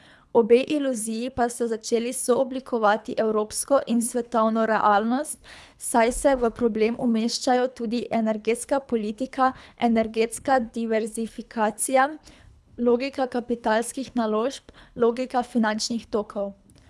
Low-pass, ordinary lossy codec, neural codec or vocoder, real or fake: none; none; codec, 24 kHz, 3 kbps, HILCodec; fake